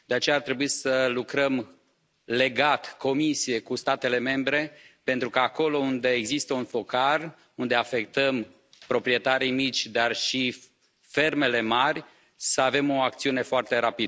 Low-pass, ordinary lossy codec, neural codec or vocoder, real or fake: none; none; none; real